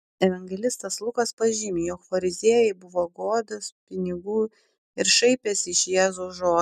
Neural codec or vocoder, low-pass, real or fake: none; 14.4 kHz; real